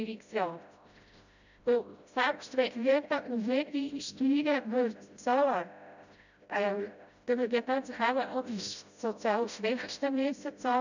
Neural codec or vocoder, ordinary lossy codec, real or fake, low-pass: codec, 16 kHz, 0.5 kbps, FreqCodec, smaller model; none; fake; 7.2 kHz